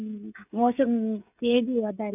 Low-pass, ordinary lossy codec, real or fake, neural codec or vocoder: 3.6 kHz; none; fake; codec, 16 kHz, 16 kbps, FreqCodec, smaller model